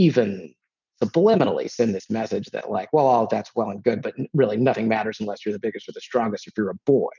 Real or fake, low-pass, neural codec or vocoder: real; 7.2 kHz; none